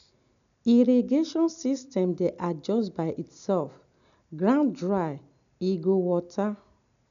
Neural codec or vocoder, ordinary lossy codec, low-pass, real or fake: none; none; 7.2 kHz; real